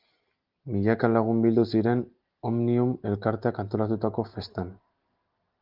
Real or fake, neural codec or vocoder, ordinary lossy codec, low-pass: real; none; Opus, 32 kbps; 5.4 kHz